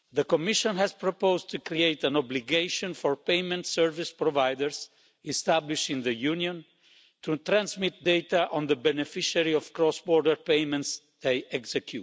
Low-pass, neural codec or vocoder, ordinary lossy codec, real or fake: none; none; none; real